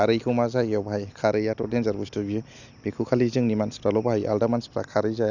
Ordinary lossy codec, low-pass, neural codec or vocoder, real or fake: none; 7.2 kHz; none; real